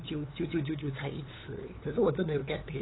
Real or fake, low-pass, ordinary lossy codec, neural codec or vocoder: fake; 7.2 kHz; AAC, 16 kbps; codec, 16 kHz, 8 kbps, FunCodec, trained on LibriTTS, 25 frames a second